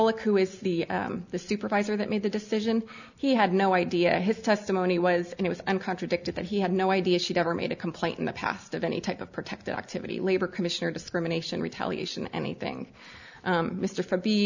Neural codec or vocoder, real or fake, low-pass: none; real; 7.2 kHz